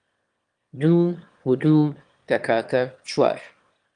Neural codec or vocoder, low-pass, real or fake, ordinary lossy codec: autoencoder, 22.05 kHz, a latent of 192 numbers a frame, VITS, trained on one speaker; 9.9 kHz; fake; Opus, 32 kbps